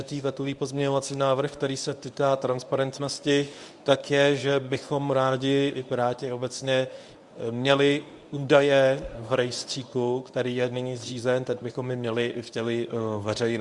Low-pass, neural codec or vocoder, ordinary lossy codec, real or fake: 10.8 kHz; codec, 24 kHz, 0.9 kbps, WavTokenizer, medium speech release version 1; Opus, 64 kbps; fake